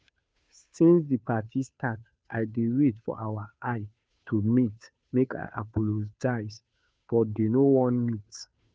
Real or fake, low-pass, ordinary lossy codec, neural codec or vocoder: fake; none; none; codec, 16 kHz, 2 kbps, FunCodec, trained on Chinese and English, 25 frames a second